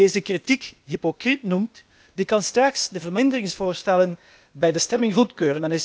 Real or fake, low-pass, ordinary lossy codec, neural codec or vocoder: fake; none; none; codec, 16 kHz, 0.8 kbps, ZipCodec